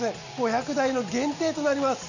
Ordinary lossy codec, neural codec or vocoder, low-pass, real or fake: none; none; 7.2 kHz; real